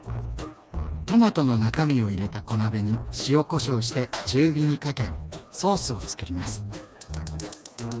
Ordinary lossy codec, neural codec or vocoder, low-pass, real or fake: none; codec, 16 kHz, 2 kbps, FreqCodec, smaller model; none; fake